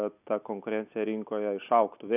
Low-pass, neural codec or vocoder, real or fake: 3.6 kHz; none; real